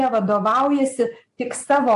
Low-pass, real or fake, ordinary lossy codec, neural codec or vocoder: 10.8 kHz; real; AAC, 64 kbps; none